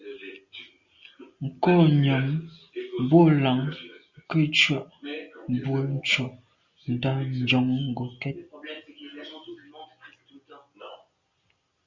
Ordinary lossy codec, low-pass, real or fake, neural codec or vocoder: Opus, 64 kbps; 7.2 kHz; real; none